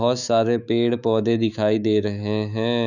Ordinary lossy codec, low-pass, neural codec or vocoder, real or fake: none; 7.2 kHz; autoencoder, 48 kHz, 128 numbers a frame, DAC-VAE, trained on Japanese speech; fake